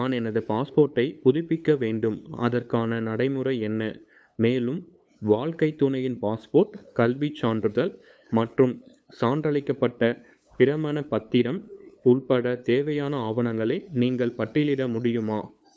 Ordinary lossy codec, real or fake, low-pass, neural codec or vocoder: none; fake; none; codec, 16 kHz, 8 kbps, FunCodec, trained on LibriTTS, 25 frames a second